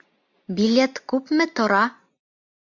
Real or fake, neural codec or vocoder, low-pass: real; none; 7.2 kHz